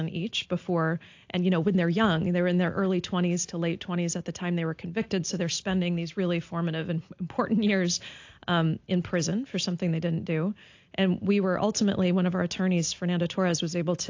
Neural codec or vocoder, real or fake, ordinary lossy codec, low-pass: none; real; AAC, 48 kbps; 7.2 kHz